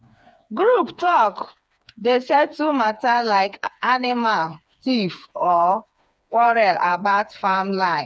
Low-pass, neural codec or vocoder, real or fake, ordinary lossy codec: none; codec, 16 kHz, 4 kbps, FreqCodec, smaller model; fake; none